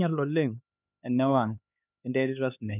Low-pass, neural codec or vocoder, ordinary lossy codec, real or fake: 3.6 kHz; codec, 16 kHz, 2 kbps, X-Codec, HuBERT features, trained on LibriSpeech; none; fake